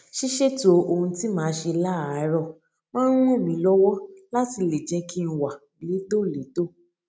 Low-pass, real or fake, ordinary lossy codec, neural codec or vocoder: none; real; none; none